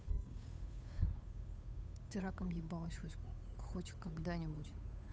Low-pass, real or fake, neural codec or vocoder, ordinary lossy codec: none; fake; codec, 16 kHz, 8 kbps, FunCodec, trained on Chinese and English, 25 frames a second; none